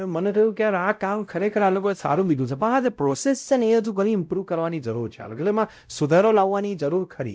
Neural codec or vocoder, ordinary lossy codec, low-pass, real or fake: codec, 16 kHz, 0.5 kbps, X-Codec, WavLM features, trained on Multilingual LibriSpeech; none; none; fake